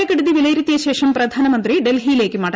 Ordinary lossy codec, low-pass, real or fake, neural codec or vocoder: none; none; real; none